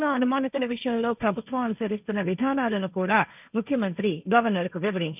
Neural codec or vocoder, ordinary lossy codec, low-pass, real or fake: codec, 16 kHz, 1.1 kbps, Voila-Tokenizer; none; 3.6 kHz; fake